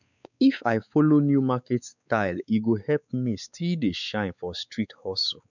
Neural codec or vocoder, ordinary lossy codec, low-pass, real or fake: codec, 16 kHz, 4 kbps, X-Codec, WavLM features, trained on Multilingual LibriSpeech; none; 7.2 kHz; fake